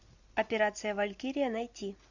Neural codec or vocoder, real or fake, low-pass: none; real; 7.2 kHz